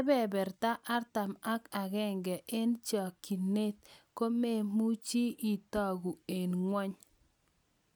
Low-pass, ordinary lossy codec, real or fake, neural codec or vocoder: none; none; real; none